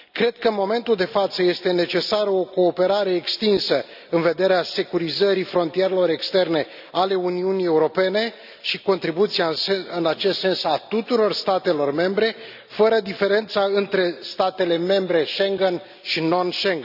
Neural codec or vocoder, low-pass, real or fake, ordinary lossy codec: none; 5.4 kHz; real; none